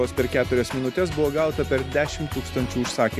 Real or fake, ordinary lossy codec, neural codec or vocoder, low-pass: real; AAC, 96 kbps; none; 14.4 kHz